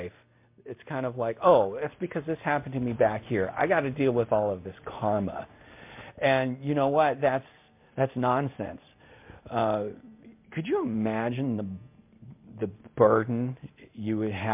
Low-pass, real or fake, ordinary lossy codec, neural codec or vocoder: 3.6 kHz; real; MP3, 32 kbps; none